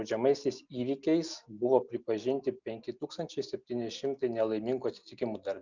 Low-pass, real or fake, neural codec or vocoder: 7.2 kHz; real; none